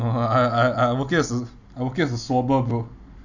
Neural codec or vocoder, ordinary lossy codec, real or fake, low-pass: none; none; real; 7.2 kHz